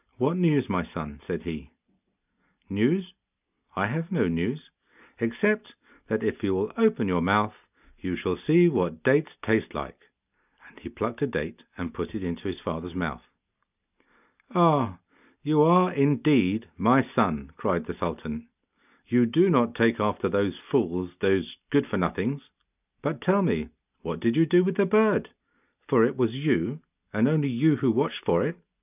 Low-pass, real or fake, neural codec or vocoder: 3.6 kHz; real; none